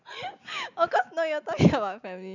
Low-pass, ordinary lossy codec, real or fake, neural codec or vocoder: 7.2 kHz; none; real; none